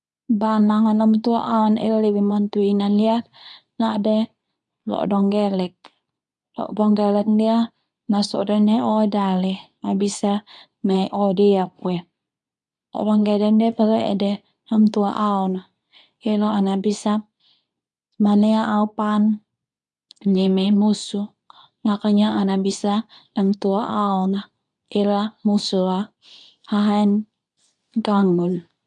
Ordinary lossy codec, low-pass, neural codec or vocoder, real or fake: none; 10.8 kHz; codec, 24 kHz, 0.9 kbps, WavTokenizer, medium speech release version 2; fake